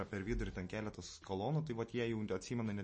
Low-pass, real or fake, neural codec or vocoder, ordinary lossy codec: 9.9 kHz; real; none; MP3, 32 kbps